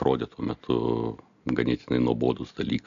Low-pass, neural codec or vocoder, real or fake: 7.2 kHz; none; real